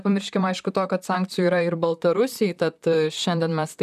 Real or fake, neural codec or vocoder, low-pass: fake; vocoder, 44.1 kHz, 128 mel bands, Pupu-Vocoder; 14.4 kHz